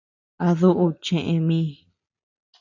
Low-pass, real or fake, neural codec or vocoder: 7.2 kHz; real; none